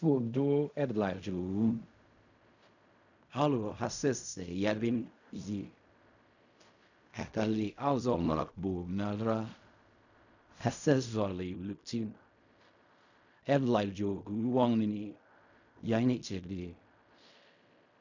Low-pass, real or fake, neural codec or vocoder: 7.2 kHz; fake; codec, 16 kHz in and 24 kHz out, 0.4 kbps, LongCat-Audio-Codec, fine tuned four codebook decoder